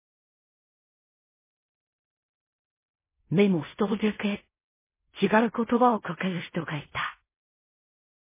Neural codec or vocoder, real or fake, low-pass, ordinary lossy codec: codec, 16 kHz in and 24 kHz out, 0.4 kbps, LongCat-Audio-Codec, fine tuned four codebook decoder; fake; 3.6 kHz; MP3, 16 kbps